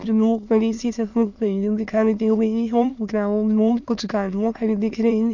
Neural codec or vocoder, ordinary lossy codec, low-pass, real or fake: autoencoder, 22.05 kHz, a latent of 192 numbers a frame, VITS, trained on many speakers; none; 7.2 kHz; fake